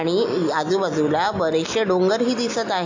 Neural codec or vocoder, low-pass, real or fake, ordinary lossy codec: none; 7.2 kHz; real; AAC, 48 kbps